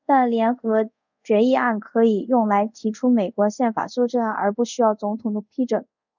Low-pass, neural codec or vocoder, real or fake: 7.2 kHz; codec, 24 kHz, 0.5 kbps, DualCodec; fake